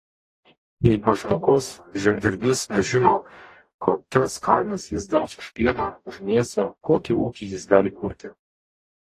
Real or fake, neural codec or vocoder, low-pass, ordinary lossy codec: fake; codec, 44.1 kHz, 0.9 kbps, DAC; 14.4 kHz; AAC, 48 kbps